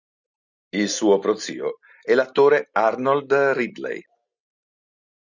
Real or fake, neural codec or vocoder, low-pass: real; none; 7.2 kHz